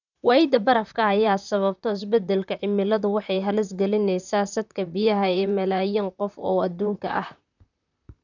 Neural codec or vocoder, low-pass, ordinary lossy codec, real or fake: vocoder, 44.1 kHz, 128 mel bands, Pupu-Vocoder; 7.2 kHz; none; fake